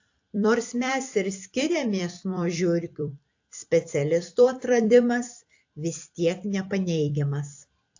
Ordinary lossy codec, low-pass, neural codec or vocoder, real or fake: AAC, 48 kbps; 7.2 kHz; vocoder, 44.1 kHz, 128 mel bands every 256 samples, BigVGAN v2; fake